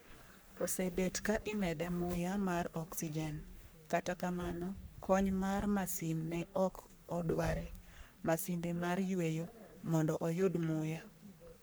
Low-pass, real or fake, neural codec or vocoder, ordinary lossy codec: none; fake; codec, 44.1 kHz, 3.4 kbps, Pupu-Codec; none